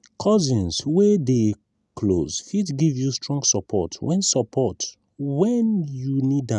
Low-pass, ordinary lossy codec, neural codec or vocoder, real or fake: 10.8 kHz; none; none; real